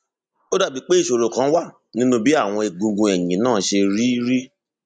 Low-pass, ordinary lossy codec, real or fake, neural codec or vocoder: 9.9 kHz; none; real; none